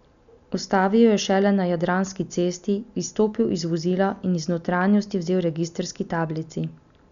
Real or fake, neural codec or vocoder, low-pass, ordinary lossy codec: real; none; 7.2 kHz; none